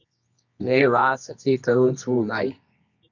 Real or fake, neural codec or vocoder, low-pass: fake; codec, 24 kHz, 0.9 kbps, WavTokenizer, medium music audio release; 7.2 kHz